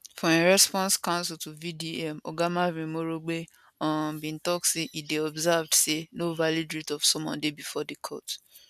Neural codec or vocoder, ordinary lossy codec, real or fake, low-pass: none; none; real; 14.4 kHz